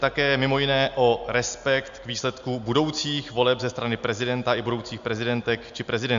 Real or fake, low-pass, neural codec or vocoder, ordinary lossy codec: real; 7.2 kHz; none; MP3, 64 kbps